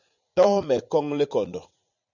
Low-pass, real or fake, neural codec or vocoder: 7.2 kHz; fake; vocoder, 44.1 kHz, 80 mel bands, Vocos